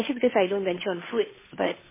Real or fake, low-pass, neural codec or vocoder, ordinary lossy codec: fake; 3.6 kHz; codec, 16 kHz, 2 kbps, FunCodec, trained on Chinese and English, 25 frames a second; MP3, 16 kbps